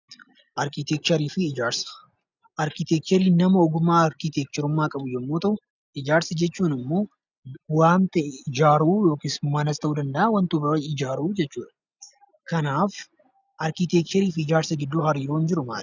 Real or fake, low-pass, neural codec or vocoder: real; 7.2 kHz; none